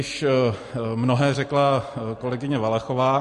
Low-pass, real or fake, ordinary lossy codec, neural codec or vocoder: 14.4 kHz; real; MP3, 48 kbps; none